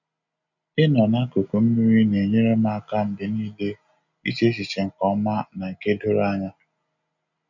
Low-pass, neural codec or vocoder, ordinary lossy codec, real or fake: 7.2 kHz; none; none; real